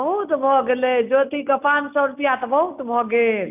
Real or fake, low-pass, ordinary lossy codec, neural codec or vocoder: real; 3.6 kHz; none; none